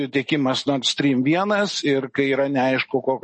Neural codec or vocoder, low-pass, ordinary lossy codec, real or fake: none; 10.8 kHz; MP3, 32 kbps; real